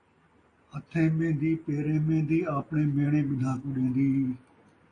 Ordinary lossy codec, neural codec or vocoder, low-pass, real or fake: AAC, 32 kbps; none; 9.9 kHz; real